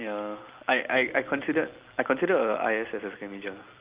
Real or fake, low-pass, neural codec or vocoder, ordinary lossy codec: real; 3.6 kHz; none; Opus, 32 kbps